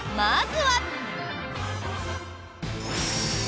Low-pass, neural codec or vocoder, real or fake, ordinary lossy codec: none; none; real; none